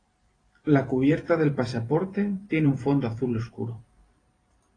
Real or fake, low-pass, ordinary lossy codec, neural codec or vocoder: fake; 9.9 kHz; AAC, 32 kbps; vocoder, 44.1 kHz, 128 mel bands every 512 samples, BigVGAN v2